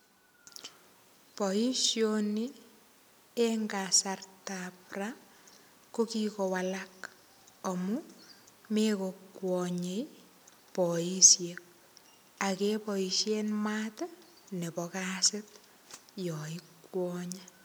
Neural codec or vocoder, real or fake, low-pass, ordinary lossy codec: none; real; none; none